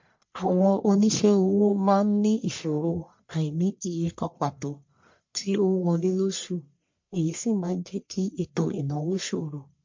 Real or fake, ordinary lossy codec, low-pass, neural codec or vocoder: fake; MP3, 48 kbps; 7.2 kHz; codec, 44.1 kHz, 1.7 kbps, Pupu-Codec